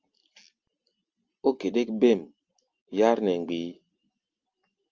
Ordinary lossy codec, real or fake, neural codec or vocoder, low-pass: Opus, 24 kbps; real; none; 7.2 kHz